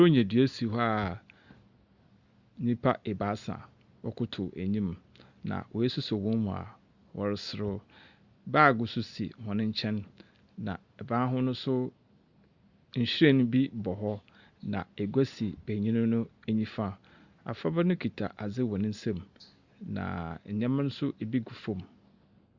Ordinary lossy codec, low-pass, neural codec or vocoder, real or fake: Opus, 64 kbps; 7.2 kHz; none; real